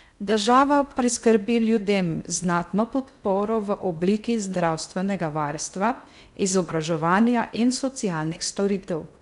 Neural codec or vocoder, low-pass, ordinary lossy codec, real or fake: codec, 16 kHz in and 24 kHz out, 0.6 kbps, FocalCodec, streaming, 2048 codes; 10.8 kHz; none; fake